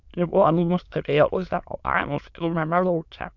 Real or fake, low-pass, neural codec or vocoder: fake; 7.2 kHz; autoencoder, 22.05 kHz, a latent of 192 numbers a frame, VITS, trained on many speakers